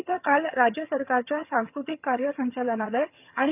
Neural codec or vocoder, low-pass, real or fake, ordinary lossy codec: vocoder, 22.05 kHz, 80 mel bands, HiFi-GAN; 3.6 kHz; fake; none